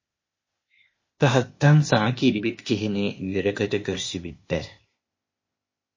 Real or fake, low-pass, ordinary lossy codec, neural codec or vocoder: fake; 7.2 kHz; MP3, 32 kbps; codec, 16 kHz, 0.8 kbps, ZipCodec